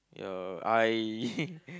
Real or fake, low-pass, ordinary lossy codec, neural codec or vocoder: real; none; none; none